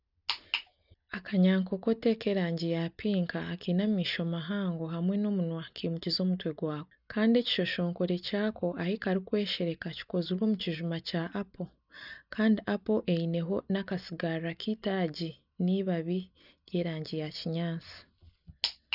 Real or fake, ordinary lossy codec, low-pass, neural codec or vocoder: real; none; 5.4 kHz; none